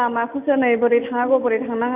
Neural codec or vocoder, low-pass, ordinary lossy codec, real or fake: none; 3.6 kHz; none; real